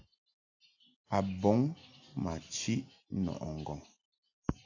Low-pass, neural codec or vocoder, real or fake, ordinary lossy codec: 7.2 kHz; none; real; AAC, 48 kbps